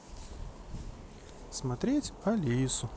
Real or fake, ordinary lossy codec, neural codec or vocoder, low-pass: real; none; none; none